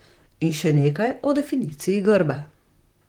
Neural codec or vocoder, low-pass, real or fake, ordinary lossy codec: vocoder, 44.1 kHz, 128 mel bands, Pupu-Vocoder; 19.8 kHz; fake; Opus, 24 kbps